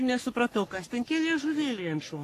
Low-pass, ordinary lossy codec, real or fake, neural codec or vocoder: 14.4 kHz; AAC, 48 kbps; fake; codec, 44.1 kHz, 3.4 kbps, Pupu-Codec